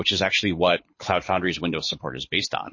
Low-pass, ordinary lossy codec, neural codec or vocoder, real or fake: 7.2 kHz; MP3, 32 kbps; codec, 24 kHz, 6 kbps, HILCodec; fake